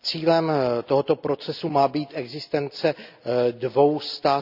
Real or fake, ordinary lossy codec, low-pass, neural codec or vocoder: real; none; 5.4 kHz; none